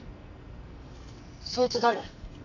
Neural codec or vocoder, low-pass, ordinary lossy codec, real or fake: codec, 44.1 kHz, 2.6 kbps, SNAC; 7.2 kHz; none; fake